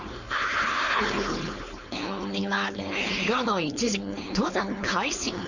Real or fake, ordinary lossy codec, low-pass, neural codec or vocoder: fake; none; 7.2 kHz; codec, 16 kHz, 4.8 kbps, FACodec